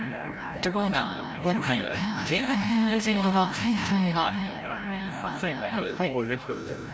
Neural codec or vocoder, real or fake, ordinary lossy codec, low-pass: codec, 16 kHz, 0.5 kbps, FreqCodec, larger model; fake; none; none